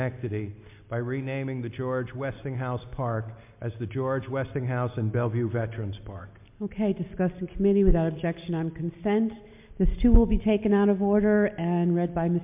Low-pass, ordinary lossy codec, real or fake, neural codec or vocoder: 3.6 kHz; MP3, 32 kbps; real; none